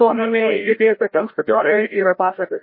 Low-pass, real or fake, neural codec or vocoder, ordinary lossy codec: 5.4 kHz; fake; codec, 16 kHz, 0.5 kbps, FreqCodec, larger model; MP3, 24 kbps